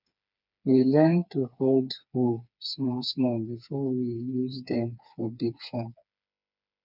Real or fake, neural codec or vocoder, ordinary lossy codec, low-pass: fake; codec, 16 kHz, 4 kbps, FreqCodec, smaller model; none; 5.4 kHz